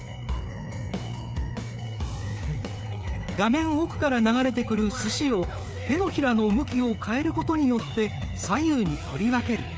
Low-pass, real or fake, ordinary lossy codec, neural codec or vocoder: none; fake; none; codec, 16 kHz, 4 kbps, FreqCodec, larger model